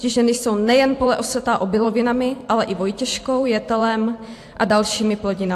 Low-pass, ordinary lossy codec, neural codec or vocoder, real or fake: 14.4 kHz; AAC, 64 kbps; vocoder, 44.1 kHz, 128 mel bands every 512 samples, BigVGAN v2; fake